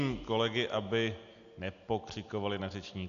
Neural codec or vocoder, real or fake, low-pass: none; real; 7.2 kHz